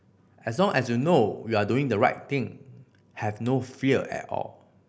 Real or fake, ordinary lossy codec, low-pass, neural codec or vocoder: real; none; none; none